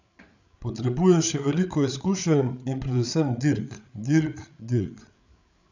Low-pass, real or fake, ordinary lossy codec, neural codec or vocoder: 7.2 kHz; fake; none; codec, 16 kHz, 8 kbps, FreqCodec, larger model